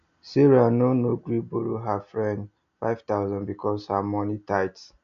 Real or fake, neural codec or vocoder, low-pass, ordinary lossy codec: real; none; 7.2 kHz; none